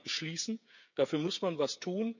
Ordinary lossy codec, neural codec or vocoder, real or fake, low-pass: none; codec, 16 kHz, 8 kbps, FreqCodec, smaller model; fake; 7.2 kHz